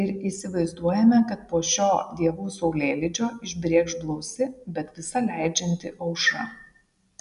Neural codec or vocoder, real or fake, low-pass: none; real; 10.8 kHz